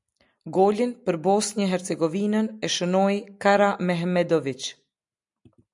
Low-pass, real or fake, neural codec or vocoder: 10.8 kHz; real; none